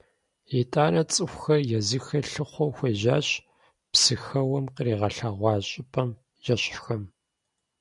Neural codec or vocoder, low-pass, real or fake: none; 10.8 kHz; real